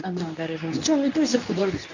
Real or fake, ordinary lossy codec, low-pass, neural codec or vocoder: fake; none; 7.2 kHz; codec, 24 kHz, 0.9 kbps, WavTokenizer, medium speech release version 2